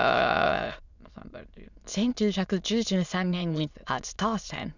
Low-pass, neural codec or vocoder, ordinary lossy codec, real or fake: 7.2 kHz; autoencoder, 22.05 kHz, a latent of 192 numbers a frame, VITS, trained on many speakers; none; fake